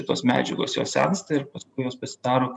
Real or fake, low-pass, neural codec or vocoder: fake; 10.8 kHz; vocoder, 44.1 kHz, 128 mel bands every 512 samples, BigVGAN v2